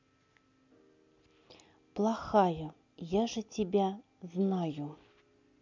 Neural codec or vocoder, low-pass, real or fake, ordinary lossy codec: none; 7.2 kHz; real; none